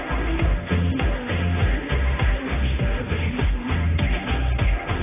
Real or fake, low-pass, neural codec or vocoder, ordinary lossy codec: fake; 3.6 kHz; codec, 16 kHz, 1.1 kbps, Voila-Tokenizer; AAC, 16 kbps